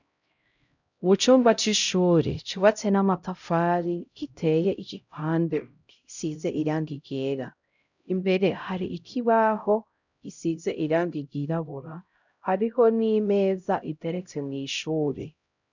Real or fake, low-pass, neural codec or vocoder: fake; 7.2 kHz; codec, 16 kHz, 0.5 kbps, X-Codec, HuBERT features, trained on LibriSpeech